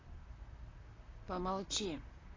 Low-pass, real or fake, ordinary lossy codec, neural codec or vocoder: 7.2 kHz; fake; AAC, 32 kbps; vocoder, 44.1 kHz, 128 mel bands, Pupu-Vocoder